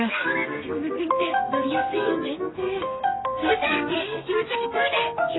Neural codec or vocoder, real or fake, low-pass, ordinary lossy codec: codec, 32 kHz, 1.9 kbps, SNAC; fake; 7.2 kHz; AAC, 16 kbps